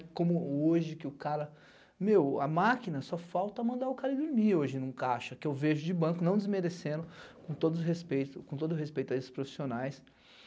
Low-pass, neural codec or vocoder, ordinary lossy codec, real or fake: none; none; none; real